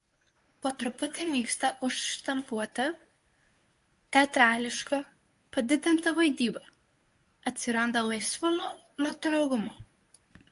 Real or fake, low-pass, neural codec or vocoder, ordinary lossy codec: fake; 10.8 kHz; codec, 24 kHz, 0.9 kbps, WavTokenizer, medium speech release version 1; AAC, 64 kbps